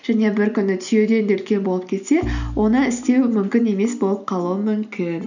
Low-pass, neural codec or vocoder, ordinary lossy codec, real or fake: 7.2 kHz; none; none; real